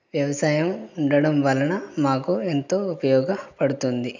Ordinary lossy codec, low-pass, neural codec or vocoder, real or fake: none; 7.2 kHz; none; real